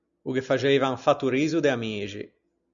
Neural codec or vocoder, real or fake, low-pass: none; real; 7.2 kHz